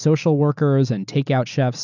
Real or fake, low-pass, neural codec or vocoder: real; 7.2 kHz; none